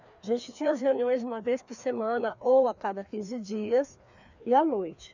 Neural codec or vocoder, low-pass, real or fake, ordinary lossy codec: codec, 16 kHz, 2 kbps, FreqCodec, larger model; 7.2 kHz; fake; none